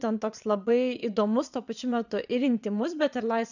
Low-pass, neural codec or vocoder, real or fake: 7.2 kHz; vocoder, 22.05 kHz, 80 mel bands, Vocos; fake